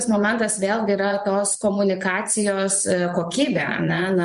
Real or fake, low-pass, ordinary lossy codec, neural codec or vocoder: fake; 10.8 kHz; MP3, 64 kbps; vocoder, 24 kHz, 100 mel bands, Vocos